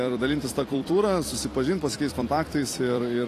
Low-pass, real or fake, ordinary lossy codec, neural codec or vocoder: 14.4 kHz; fake; AAC, 48 kbps; vocoder, 44.1 kHz, 128 mel bands every 256 samples, BigVGAN v2